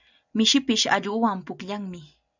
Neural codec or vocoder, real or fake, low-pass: none; real; 7.2 kHz